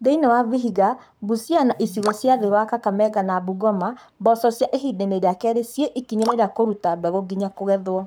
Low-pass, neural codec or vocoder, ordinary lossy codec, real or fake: none; codec, 44.1 kHz, 7.8 kbps, Pupu-Codec; none; fake